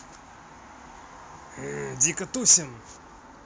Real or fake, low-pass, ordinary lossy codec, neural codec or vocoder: real; none; none; none